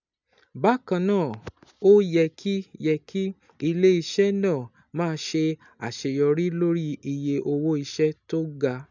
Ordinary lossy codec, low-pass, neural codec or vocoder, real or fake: none; 7.2 kHz; none; real